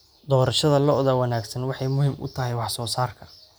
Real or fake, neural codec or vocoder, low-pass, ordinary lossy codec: real; none; none; none